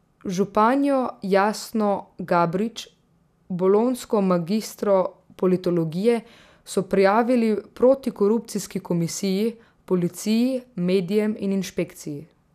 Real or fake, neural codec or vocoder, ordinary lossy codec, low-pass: real; none; none; 14.4 kHz